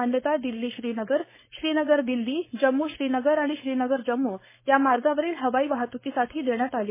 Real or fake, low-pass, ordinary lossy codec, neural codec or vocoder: fake; 3.6 kHz; MP3, 16 kbps; codec, 16 kHz, 4.8 kbps, FACodec